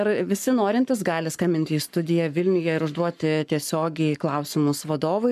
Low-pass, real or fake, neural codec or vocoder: 14.4 kHz; fake; codec, 44.1 kHz, 7.8 kbps, Pupu-Codec